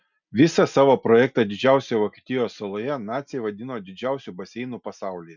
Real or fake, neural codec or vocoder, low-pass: real; none; 7.2 kHz